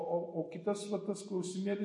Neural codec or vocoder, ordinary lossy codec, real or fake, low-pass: none; MP3, 48 kbps; real; 10.8 kHz